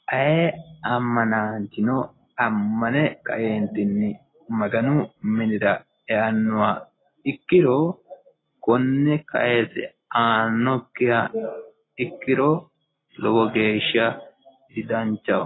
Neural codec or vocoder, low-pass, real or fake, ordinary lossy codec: none; 7.2 kHz; real; AAC, 16 kbps